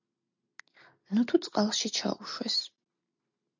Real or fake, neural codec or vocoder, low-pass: real; none; 7.2 kHz